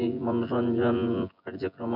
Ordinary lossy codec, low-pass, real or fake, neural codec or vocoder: none; 5.4 kHz; fake; vocoder, 24 kHz, 100 mel bands, Vocos